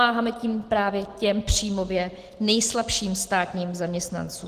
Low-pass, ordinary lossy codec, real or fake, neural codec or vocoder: 14.4 kHz; Opus, 16 kbps; real; none